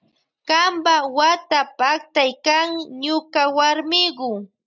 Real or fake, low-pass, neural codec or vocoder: real; 7.2 kHz; none